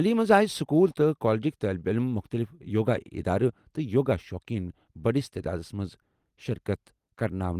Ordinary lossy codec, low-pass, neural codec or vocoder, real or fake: Opus, 24 kbps; 14.4 kHz; none; real